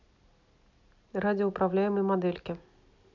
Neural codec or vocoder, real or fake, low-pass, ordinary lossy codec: none; real; 7.2 kHz; none